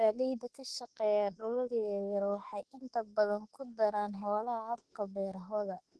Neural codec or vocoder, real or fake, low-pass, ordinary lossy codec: autoencoder, 48 kHz, 32 numbers a frame, DAC-VAE, trained on Japanese speech; fake; 10.8 kHz; Opus, 16 kbps